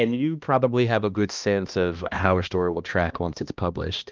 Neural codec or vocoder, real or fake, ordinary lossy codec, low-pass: codec, 16 kHz, 1 kbps, X-Codec, HuBERT features, trained on balanced general audio; fake; Opus, 24 kbps; 7.2 kHz